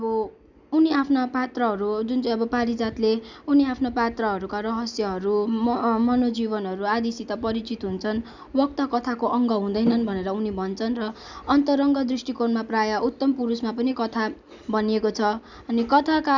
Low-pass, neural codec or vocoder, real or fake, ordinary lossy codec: 7.2 kHz; none; real; none